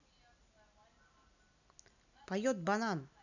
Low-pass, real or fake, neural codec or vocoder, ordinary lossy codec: 7.2 kHz; real; none; none